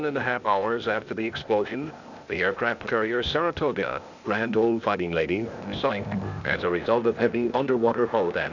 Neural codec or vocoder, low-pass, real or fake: codec, 16 kHz, 0.8 kbps, ZipCodec; 7.2 kHz; fake